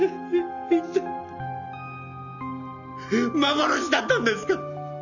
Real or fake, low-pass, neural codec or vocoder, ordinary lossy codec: real; 7.2 kHz; none; none